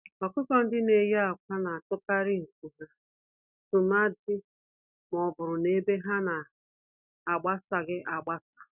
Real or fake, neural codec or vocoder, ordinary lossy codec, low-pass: real; none; none; 3.6 kHz